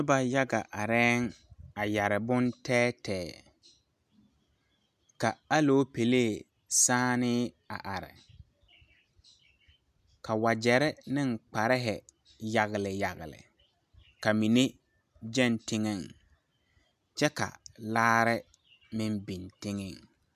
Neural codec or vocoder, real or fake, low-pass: none; real; 14.4 kHz